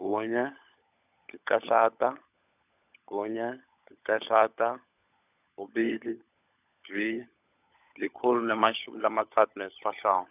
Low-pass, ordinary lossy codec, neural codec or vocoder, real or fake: 3.6 kHz; none; codec, 16 kHz, 16 kbps, FunCodec, trained on LibriTTS, 50 frames a second; fake